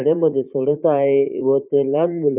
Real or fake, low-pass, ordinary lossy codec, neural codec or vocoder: fake; 3.6 kHz; none; vocoder, 44.1 kHz, 80 mel bands, Vocos